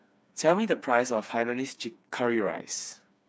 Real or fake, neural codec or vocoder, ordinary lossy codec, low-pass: fake; codec, 16 kHz, 4 kbps, FreqCodec, smaller model; none; none